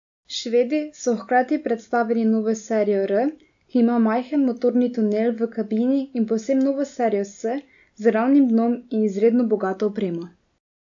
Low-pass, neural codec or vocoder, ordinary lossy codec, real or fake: 7.2 kHz; none; AAC, 64 kbps; real